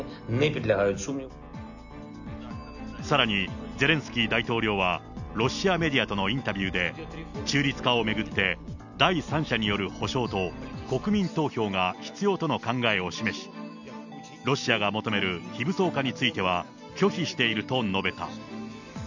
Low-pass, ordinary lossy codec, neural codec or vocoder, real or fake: 7.2 kHz; none; none; real